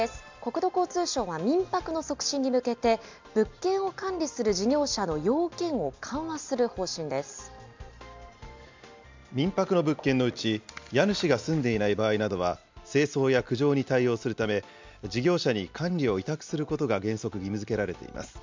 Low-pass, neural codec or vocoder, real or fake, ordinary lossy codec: 7.2 kHz; none; real; MP3, 64 kbps